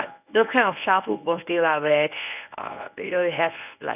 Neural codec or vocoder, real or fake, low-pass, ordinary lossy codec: codec, 24 kHz, 0.9 kbps, WavTokenizer, medium speech release version 1; fake; 3.6 kHz; none